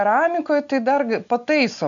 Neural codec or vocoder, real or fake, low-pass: none; real; 7.2 kHz